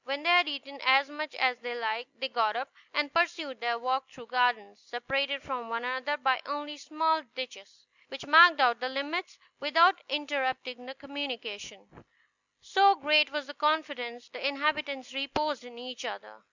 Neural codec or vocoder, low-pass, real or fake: none; 7.2 kHz; real